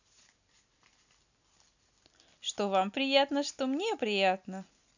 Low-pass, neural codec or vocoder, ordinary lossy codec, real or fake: 7.2 kHz; none; none; real